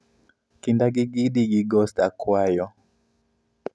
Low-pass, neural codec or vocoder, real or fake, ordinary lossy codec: none; none; real; none